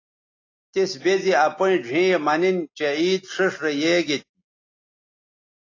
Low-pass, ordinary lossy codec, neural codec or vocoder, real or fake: 7.2 kHz; AAC, 32 kbps; none; real